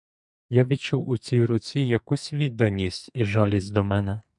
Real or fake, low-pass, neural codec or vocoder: fake; 10.8 kHz; codec, 44.1 kHz, 2.6 kbps, SNAC